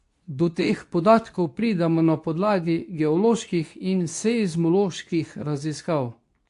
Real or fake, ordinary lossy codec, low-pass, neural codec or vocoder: fake; AAC, 64 kbps; 10.8 kHz; codec, 24 kHz, 0.9 kbps, WavTokenizer, medium speech release version 2